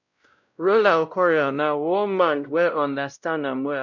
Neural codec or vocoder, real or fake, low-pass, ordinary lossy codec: codec, 16 kHz, 0.5 kbps, X-Codec, WavLM features, trained on Multilingual LibriSpeech; fake; 7.2 kHz; none